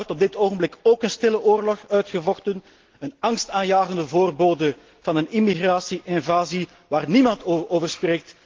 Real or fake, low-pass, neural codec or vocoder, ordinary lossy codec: real; 7.2 kHz; none; Opus, 16 kbps